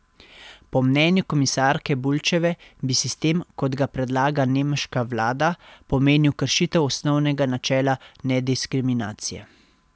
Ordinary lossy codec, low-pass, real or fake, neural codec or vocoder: none; none; real; none